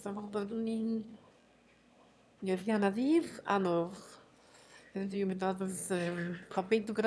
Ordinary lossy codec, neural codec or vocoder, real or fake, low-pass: none; autoencoder, 22.05 kHz, a latent of 192 numbers a frame, VITS, trained on one speaker; fake; none